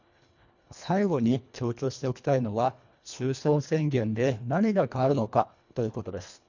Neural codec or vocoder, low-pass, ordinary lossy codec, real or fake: codec, 24 kHz, 1.5 kbps, HILCodec; 7.2 kHz; none; fake